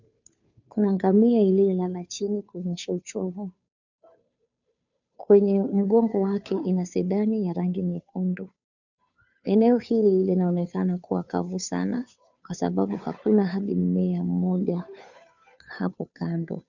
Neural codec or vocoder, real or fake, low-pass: codec, 16 kHz, 2 kbps, FunCodec, trained on Chinese and English, 25 frames a second; fake; 7.2 kHz